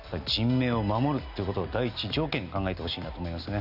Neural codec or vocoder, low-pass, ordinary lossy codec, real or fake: none; 5.4 kHz; none; real